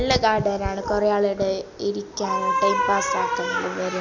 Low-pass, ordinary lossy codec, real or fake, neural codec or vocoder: 7.2 kHz; none; real; none